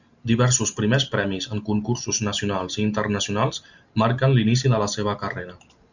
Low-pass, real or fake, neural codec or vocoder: 7.2 kHz; real; none